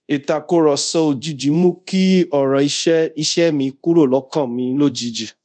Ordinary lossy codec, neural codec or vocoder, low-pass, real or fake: none; codec, 24 kHz, 0.5 kbps, DualCodec; 9.9 kHz; fake